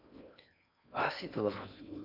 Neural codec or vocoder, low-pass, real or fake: codec, 16 kHz in and 24 kHz out, 0.6 kbps, FocalCodec, streaming, 4096 codes; 5.4 kHz; fake